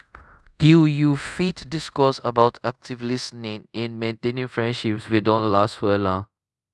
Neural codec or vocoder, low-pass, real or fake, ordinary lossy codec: codec, 24 kHz, 0.5 kbps, DualCodec; none; fake; none